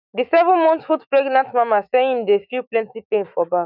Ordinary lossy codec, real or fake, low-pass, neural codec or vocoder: none; fake; 5.4 kHz; autoencoder, 48 kHz, 128 numbers a frame, DAC-VAE, trained on Japanese speech